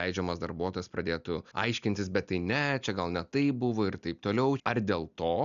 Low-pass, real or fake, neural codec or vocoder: 7.2 kHz; real; none